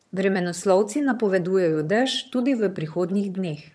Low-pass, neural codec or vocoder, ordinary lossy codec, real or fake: none; vocoder, 22.05 kHz, 80 mel bands, HiFi-GAN; none; fake